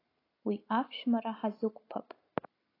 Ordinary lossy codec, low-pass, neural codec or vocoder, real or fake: AAC, 24 kbps; 5.4 kHz; none; real